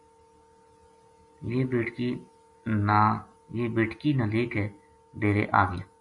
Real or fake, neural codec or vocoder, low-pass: real; none; 10.8 kHz